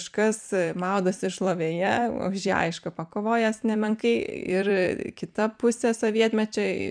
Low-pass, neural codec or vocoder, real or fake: 9.9 kHz; vocoder, 44.1 kHz, 128 mel bands every 256 samples, BigVGAN v2; fake